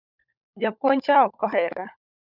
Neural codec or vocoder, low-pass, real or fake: codec, 16 kHz, 16 kbps, FunCodec, trained on LibriTTS, 50 frames a second; 5.4 kHz; fake